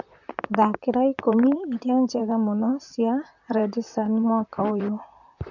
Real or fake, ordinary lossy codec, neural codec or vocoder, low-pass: fake; none; vocoder, 44.1 kHz, 128 mel bands, Pupu-Vocoder; 7.2 kHz